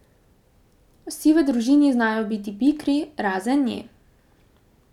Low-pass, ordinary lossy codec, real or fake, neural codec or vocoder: 19.8 kHz; none; real; none